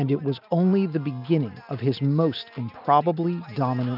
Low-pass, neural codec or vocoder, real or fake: 5.4 kHz; none; real